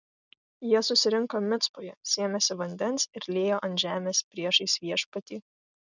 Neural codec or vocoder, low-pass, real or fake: none; 7.2 kHz; real